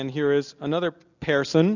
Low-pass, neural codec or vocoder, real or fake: 7.2 kHz; none; real